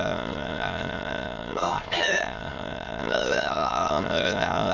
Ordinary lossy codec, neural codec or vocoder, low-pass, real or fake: none; autoencoder, 22.05 kHz, a latent of 192 numbers a frame, VITS, trained on many speakers; 7.2 kHz; fake